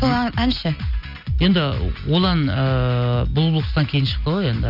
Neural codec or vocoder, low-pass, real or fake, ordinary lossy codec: none; 5.4 kHz; real; none